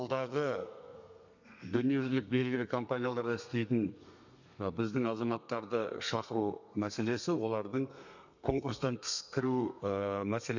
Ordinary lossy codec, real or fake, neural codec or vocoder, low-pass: none; fake; codec, 32 kHz, 1.9 kbps, SNAC; 7.2 kHz